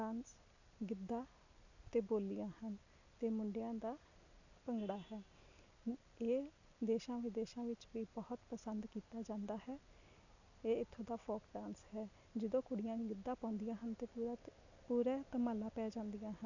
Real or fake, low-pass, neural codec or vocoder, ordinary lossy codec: real; 7.2 kHz; none; none